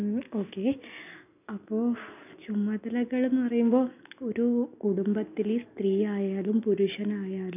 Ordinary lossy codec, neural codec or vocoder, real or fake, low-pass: none; none; real; 3.6 kHz